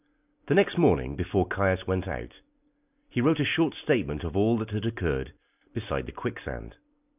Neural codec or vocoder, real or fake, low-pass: none; real; 3.6 kHz